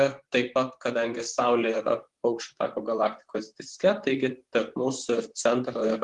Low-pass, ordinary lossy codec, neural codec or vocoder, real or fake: 10.8 kHz; Opus, 24 kbps; vocoder, 44.1 kHz, 128 mel bands, Pupu-Vocoder; fake